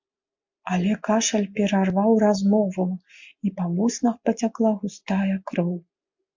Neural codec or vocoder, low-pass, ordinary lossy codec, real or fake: none; 7.2 kHz; MP3, 64 kbps; real